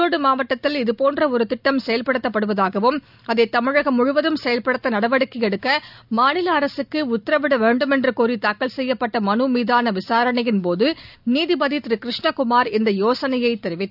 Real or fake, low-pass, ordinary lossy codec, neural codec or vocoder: real; 5.4 kHz; none; none